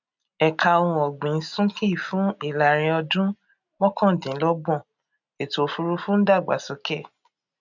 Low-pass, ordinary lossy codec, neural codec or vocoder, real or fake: 7.2 kHz; none; none; real